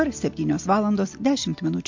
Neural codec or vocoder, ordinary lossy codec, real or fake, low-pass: none; MP3, 48 kbps; real; 7.2 kHz